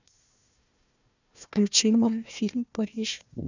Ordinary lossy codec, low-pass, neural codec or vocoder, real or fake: none; 7.2 kHz; codec, 16 kHz, 1 kbps, FunCodec, trained on Chinese and English, 50 frames a second; fake